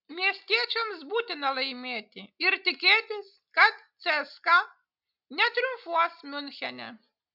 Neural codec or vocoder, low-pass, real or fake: none; 5.4 kHz; real